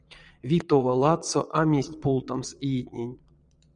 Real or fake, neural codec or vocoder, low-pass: fake; vocoder, 22.05 kHz, 80 mel bands, Vocos; 9.9 kHz